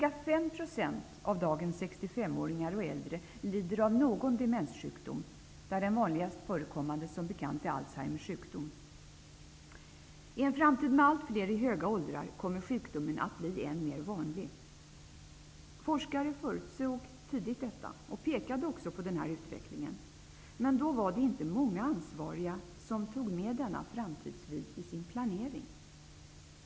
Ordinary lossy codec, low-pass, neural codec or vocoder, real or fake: none; none; none; real